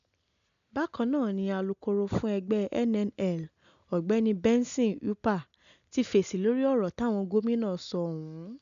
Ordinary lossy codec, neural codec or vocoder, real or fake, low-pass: MP3, 96 kbps; none; real; 7.2 kHz